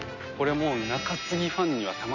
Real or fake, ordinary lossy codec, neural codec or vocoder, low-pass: real; none; none; 7.2 kHz